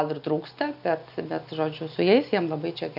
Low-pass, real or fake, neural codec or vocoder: 5.4 kHz; real; none